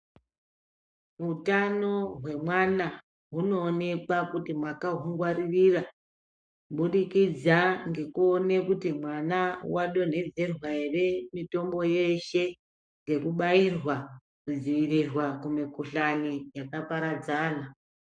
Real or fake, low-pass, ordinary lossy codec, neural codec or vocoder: fake; 9.9 kHz; MP3, 96 kbps; codec, 44.1 kHz, 7.8 kbps, Pupu-Codec